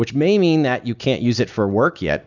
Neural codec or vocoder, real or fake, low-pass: none; real; 7.2 kHz